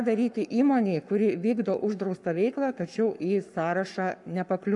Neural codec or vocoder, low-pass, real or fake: codec, 44.1 kHz, 7.8 kbps, Pupu-Codec; 10.8 kHz; fake